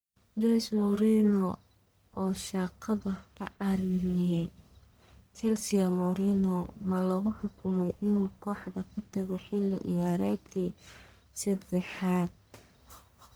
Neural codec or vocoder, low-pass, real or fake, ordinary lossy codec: codec, 44.1 kHz, 1.7 kbps, Pupu-Codec; none; fake; none